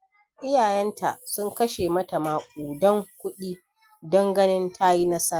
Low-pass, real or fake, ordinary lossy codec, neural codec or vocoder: 19.8 kHz; real; Opus, 32 kbps; none